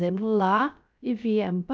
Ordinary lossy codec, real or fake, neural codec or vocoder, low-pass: none; fake; codec, 16 kHz, 0.3 kbps, FocalCodec; none